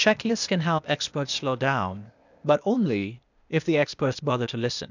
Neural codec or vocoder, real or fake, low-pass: codec, 16 kHz, 0.8 kbps, ZipCodec; fake; 7.2 kHz